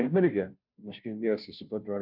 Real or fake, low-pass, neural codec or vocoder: fake; 5.4 kHz; codec, 16 kHz, 0.5 kbps, FunCodec, trained on Chinese and English, 25 frames a second